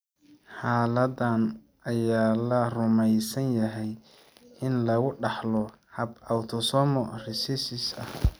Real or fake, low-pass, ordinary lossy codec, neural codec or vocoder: real; none; none; none